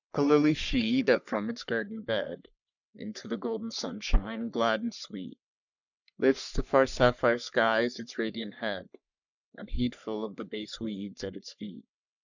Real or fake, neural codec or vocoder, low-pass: fake; codec, 44.1 kHz, 3.4 kbps, Pupu-Codec; 7.2 kHz